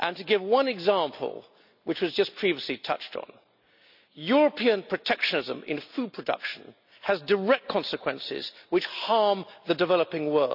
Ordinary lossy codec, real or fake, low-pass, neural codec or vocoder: none; real; 5.4 kHz; none